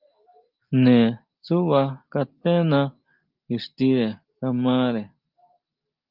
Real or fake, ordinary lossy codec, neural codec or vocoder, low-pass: real; Opus, 32 kbps; none; 5.4 kHz